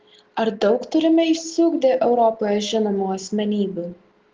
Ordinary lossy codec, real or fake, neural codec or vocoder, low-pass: Opus, 16 kbps; real; none; 7.2 kHz